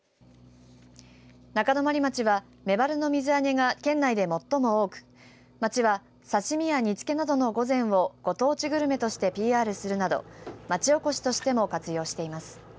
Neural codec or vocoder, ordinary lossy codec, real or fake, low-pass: none; none; real; none